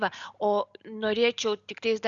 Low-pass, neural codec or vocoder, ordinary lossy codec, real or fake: 7.2 kHz; none; Opus, 64 kbps; real